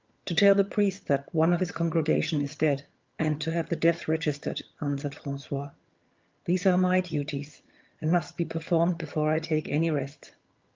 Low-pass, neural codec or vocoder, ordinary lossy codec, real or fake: 7.2 kHz; vocoder, 22.05 kHz, 80 mel bands, HiFi-GAN; Opus, 24 kbps; fake